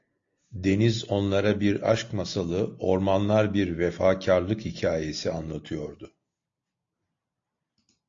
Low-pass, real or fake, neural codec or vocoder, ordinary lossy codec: 7.2 kHz; real; none; AAC, 48 kbps